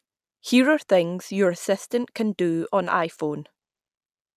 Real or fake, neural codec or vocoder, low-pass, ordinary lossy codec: real; none; 14.4 kHz; none